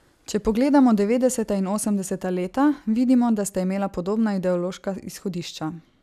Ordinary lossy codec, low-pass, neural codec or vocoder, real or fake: none; 14.4 kHz; none; real